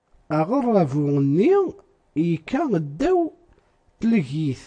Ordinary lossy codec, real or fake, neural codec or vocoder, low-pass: AAC, 48 kbps; real; none; 9.9 kHz